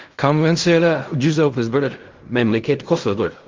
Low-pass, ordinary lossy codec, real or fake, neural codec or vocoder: 7.2 kHz; Opus, 32 kbps; fake; codec, 16 kHz in and 24 kHz out, 0.4 kbps, LongCat-Audio-Codec, fine tuned four codebook decoder